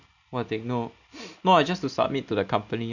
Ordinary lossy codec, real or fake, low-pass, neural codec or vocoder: none; real; 7.2 kHz; none